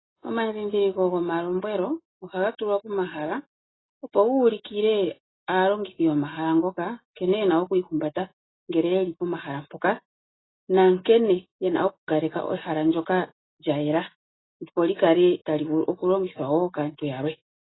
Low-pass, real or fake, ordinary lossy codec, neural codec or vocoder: 7.2 kHz; real; AAC, 16 kbps; none